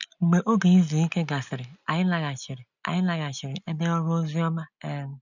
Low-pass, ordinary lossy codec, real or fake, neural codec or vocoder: 7.2 kHz; none; real; none